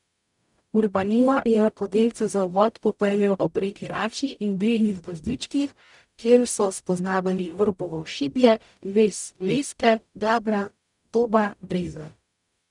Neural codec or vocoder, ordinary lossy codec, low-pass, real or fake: codec, 44.1 kHz, 0.9 kbps, DAC; none; 10.8 kHz; fake